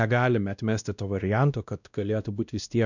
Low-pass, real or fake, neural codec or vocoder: 7.2 kHz; fake; codec, 16 kHz, 1 kbps, X-Codec, WavLM features, trained on Multilingual LibriSpeech